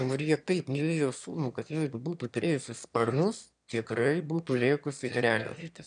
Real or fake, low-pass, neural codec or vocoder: fake; 9.9 kHz; autoencoder, 22.05 kHz, a latent of 192 numbers a frame, VITS, trained on one speaker